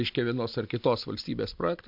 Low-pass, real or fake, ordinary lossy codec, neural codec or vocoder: 5.4 kHz; real; MP3, 48 kbps; none